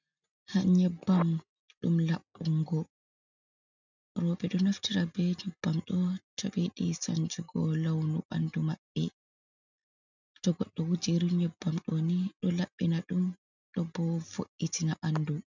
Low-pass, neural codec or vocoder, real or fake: 7.2 kHz; none; real